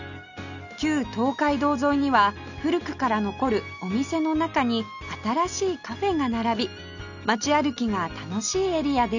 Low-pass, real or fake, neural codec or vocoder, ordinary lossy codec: 7.2 kHz; real; none; none